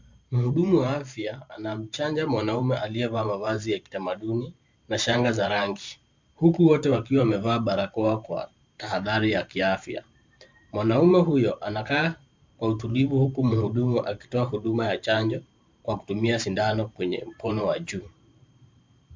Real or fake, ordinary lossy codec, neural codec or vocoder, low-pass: fake; MP3, 64 kbps; vocoder, 44.1 kHz, 128 mel bands every 512 samples, BigVGAN v2; 7.2 kHz